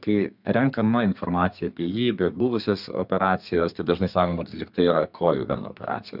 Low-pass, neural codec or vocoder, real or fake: 5.4 kHz; codec, 32 kHz, 1.9 kbps, SNAC; fake